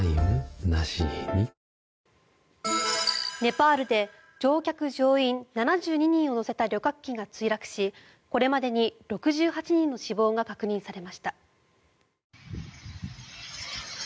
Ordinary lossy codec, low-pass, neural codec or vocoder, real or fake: none; none; none; real